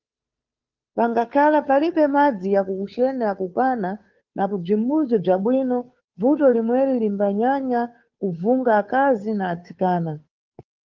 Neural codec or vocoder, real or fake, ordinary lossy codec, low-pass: codec, 16 kHz, 2 kbps, FunCodec, trained on Chinese and English, 25 frames a second; fake; Opus, 24 kbps; 7.2 kHz